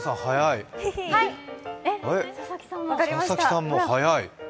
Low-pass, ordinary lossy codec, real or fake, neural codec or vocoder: none; none; real; none